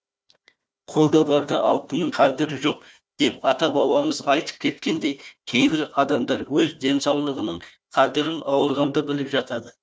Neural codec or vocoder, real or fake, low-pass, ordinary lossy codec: codec, 16 kHz, 1 kbps, FunCodec, trained on Chinese and English, 50 frames a second; fake; none; none